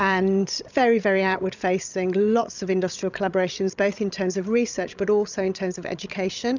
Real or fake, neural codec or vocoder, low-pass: fake; codec, 16 kHz, 16 kbps, FreqCodec, larger model; 7.2 kHz